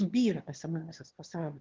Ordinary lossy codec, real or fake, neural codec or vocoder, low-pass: Opus, 16 kbps; fake; autoencoder, 22.05 kHz, a latent of 192 numbers a frame, VITS, trained on one speaker; 7.2 kHz